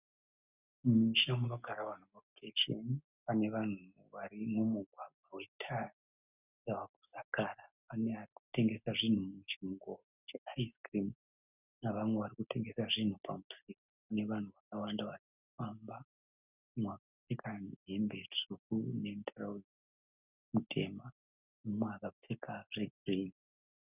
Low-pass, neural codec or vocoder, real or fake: 3.6 kHz; none; real